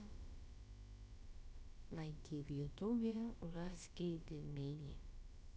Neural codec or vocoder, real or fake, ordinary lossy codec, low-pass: codec, 16 kHz, about 1 kbps, DyCAST, with the encoder's durations; fake; none; none